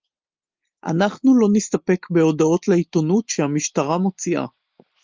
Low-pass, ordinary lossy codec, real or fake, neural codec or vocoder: 7.2 kHz; Opus, 32 kbps; real; none